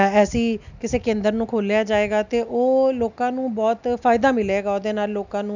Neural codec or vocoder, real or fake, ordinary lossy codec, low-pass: none; real; none; 7.2 kHz